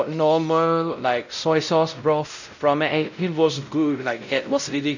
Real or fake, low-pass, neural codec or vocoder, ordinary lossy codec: fake; 7.2 kHz; codec, 16 kHz, 0.5 kbps, X-Codec, WavLM features, trained on Multilingual LibriSpeech; none